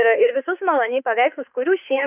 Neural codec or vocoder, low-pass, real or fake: autoencoder, 48 kHz, 32 numbers a frame, DAC-VAE, trained on Japanese speech; 3.6 kHz; fake